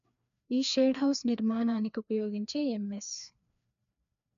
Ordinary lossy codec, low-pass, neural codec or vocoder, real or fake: none; 7.2 kHz; codec, 16 kHz, 2 kbps, FreqCodec, larger model; fake